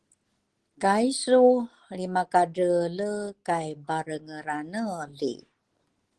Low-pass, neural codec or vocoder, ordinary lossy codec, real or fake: 10.8 kHz; none; Opus, 16 kbps; real